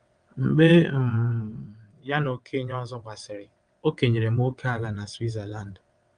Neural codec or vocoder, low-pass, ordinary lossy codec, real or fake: vocoder, 22.05 kHz, 80 mel bands, WaveNeXt; 9.9 kHz; Opus, 32 kbps; fake